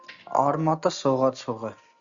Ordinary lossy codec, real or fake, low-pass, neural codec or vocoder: Opus, 64 kbps; real; 7.2 kHz; none